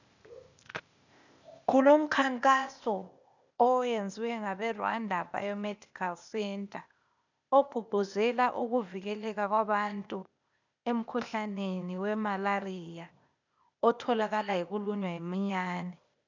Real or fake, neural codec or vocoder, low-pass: fake; codec, 16 kHz, 0.8 kbps, ZipCodec; 7.2 kHz